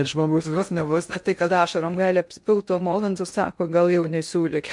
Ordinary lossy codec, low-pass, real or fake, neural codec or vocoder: MP3, 64 kbps; 10.8 kHz; fake; codec, 16 kHz in and 24 kHz out, 0.6 kbps, FocalCodec, streaming, 2048 codes